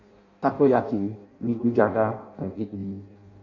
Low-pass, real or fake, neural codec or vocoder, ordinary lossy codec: 7.2 kHz; fake; codec, 16 kHz in and 24 kHz out, 0.6 kbps, FireRedTTS-2 codec; MP3, 48 kbps